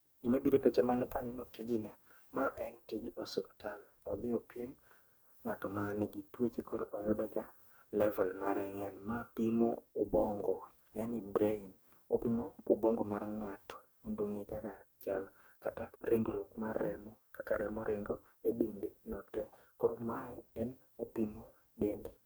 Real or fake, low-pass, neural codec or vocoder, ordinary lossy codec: fake; none; codec, 44.1 kHz, 2.6 kbps, DAC; none